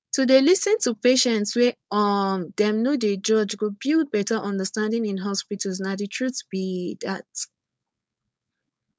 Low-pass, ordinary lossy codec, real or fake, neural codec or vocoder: none; none; fake; codec, 16 kHz, 4.8 kbps, FACodec